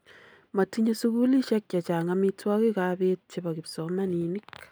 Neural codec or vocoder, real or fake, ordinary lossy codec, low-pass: none; real; none; none